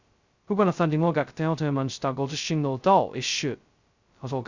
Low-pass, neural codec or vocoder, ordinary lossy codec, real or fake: 7.2 kHz; codec, 16 kHz, 0.2 kbps, FocalCodec; Opus, 64 kbps; fake